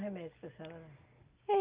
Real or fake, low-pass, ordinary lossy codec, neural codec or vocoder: real; 3.6 kHz; Opus, 24 kbps; none